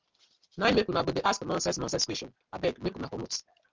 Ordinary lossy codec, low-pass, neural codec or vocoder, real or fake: Opus, 24 kbps; 7.2 kHz; none; real